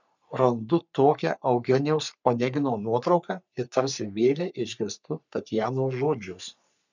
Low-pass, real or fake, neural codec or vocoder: 7.2 kHz; fake; codec, 44.1 kHz, 3.4 kbps, Pupu-Codec